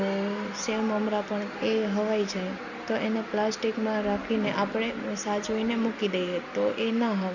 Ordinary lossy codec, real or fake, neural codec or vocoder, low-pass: none; real; none; 7.2 kHz